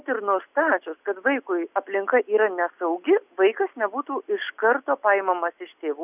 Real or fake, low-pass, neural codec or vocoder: real; 3.6 kHz; none